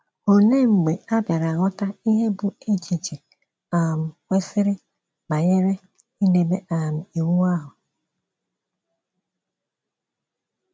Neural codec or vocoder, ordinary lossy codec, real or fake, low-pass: none; none; real; none